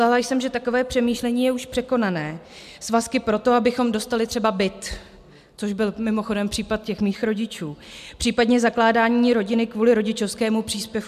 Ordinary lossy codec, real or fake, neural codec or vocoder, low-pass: MP3, 96 kbps; real; none; 14.4 kHz